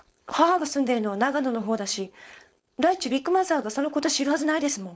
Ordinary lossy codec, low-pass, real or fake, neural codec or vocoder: none; none; fake; codec, 16 kHz, 4.8 kbps, FACodec